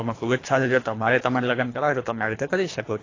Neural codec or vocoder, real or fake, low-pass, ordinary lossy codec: codec, 24 kHz, 3 kbps, HILCodec; fake; 7.2 kHz; AAC, 32 kbps